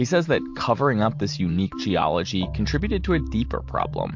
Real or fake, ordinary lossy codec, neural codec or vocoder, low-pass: real; MP3, 48 kbps; none; 7.2 kHz